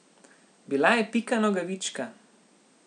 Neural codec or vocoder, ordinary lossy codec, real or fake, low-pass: none; none; real; 9.9 kHz